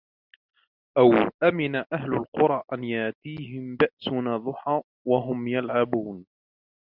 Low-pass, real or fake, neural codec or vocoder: 5.4 kHz; real; none